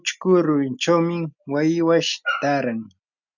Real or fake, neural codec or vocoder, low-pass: real; none; 7.2 kHz